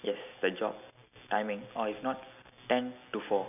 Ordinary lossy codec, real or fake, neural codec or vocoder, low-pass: none; real; none; 3.6 kHz